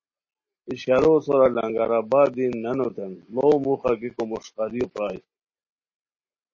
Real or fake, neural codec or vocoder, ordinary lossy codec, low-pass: real; none; MP3, 32 kbps; 7.2 kHz